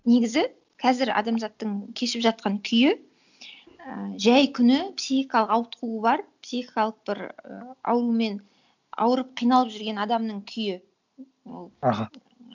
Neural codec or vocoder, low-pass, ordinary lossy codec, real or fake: none; 7.2 kHz; none; real